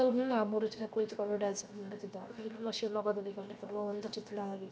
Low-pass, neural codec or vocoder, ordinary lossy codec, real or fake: none; codec, 16 kHz, 0.7 kbps, FocalCodec; none; fake